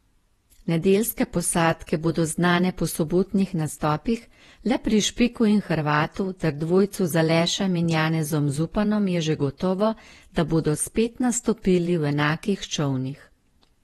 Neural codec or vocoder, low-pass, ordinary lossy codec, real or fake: vocoder, 48 kHz, 128 mel bands, Vocos; 19.8 kHz; AAC, 32 kbps; fake